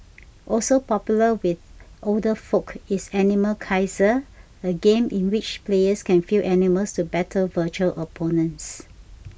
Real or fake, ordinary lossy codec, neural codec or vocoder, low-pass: real; none; none; none